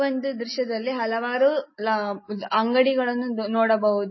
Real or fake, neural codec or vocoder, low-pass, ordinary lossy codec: real; none; 7.2 kHz; MP3, 24 kbps